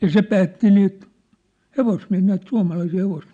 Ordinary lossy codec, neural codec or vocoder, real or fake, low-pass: none; none; real; 9.9 kHz